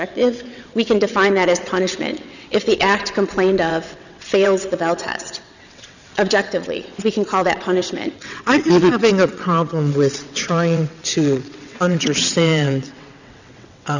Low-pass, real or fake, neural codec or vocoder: 7.2 kHz; fake; vocoder, 22.05 kHz, 80 mel bands, WaveNeXt